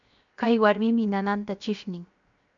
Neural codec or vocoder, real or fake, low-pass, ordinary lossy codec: codec, 16 kHz, 0.7 kbps, FocalCodec; fake; 7.2 kHz; MP3, 64 kbps